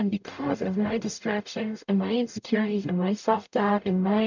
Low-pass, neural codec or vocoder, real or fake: 7.2 kHz; codec, 44.1 kHz, 0.9 kbps, DAC; fake